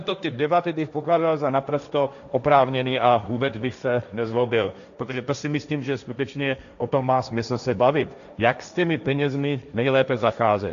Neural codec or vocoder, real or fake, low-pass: codec, 16 kHz, 1.1 kbps, Voila-Tokenizer; fake; 7.2 kHz